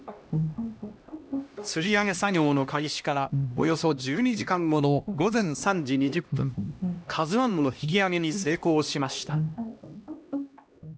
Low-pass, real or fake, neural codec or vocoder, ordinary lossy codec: none; fake; codec, 16 kHz, 1 kbps, X-Codec, HuBERT features, trained on LibriSpeech; none